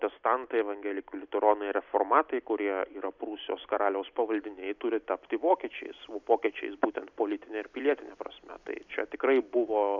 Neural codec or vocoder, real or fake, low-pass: none; real; 7.2 kHz